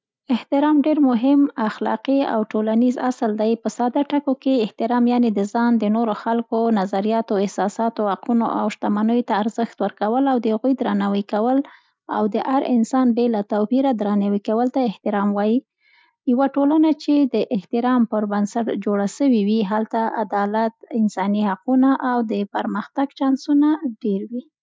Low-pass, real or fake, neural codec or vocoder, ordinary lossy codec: none; real; none; none